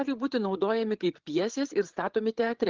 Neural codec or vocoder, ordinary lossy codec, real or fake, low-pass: codec, 16 kHz, 8 kbps, FreqCodec, larger model; Opus, 16 kbps; fake; 7.2 kHz